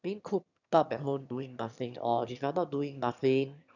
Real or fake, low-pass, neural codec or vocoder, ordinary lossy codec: fake; 7.2 kHz; autoencoder, 22.05 kHz, a latent of 192 numbers a frame, VITS, trained on one speaker; none